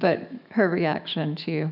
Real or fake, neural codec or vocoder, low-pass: fake; autoencoder, 48 kHz, 128 numbers a frame, DAC-VAE, trained on Japanese speech; 5.4 kHz